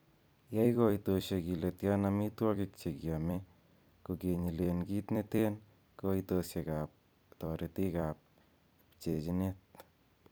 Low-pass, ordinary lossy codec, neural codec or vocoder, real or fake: none; none; vocoder, 44.1 kHz, 128 mel bands every 256 samples, BigVGAN v2; fake